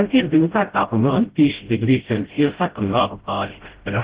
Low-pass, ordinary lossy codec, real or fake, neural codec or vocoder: 3.6 kHz; Opus, 16 kbps; fake; codec, 16 kHz, 0.5 kbps, FreqCodec, smaller model